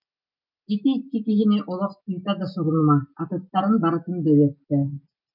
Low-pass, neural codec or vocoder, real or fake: 5.4 kHz; none; real